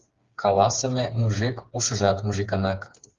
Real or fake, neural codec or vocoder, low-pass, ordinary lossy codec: fake; codec, 16 kHz, 4 kbps, FreqCodec, smaller model; 7.2 kHz; Opus, 32 kbps